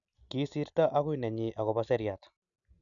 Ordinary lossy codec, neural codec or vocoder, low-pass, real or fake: none; none; 7.2 kHz; real